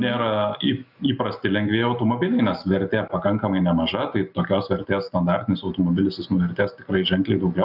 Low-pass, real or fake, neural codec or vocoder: 5.4 kHz; real; none